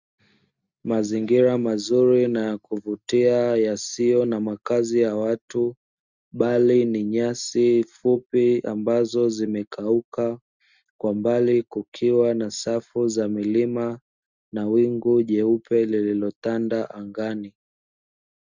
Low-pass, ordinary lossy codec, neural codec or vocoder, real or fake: 7.2 kHz; Opus, 64 kbps; none; real